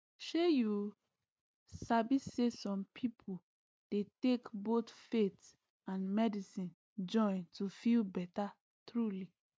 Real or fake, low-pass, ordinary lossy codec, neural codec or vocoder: real; none; none; none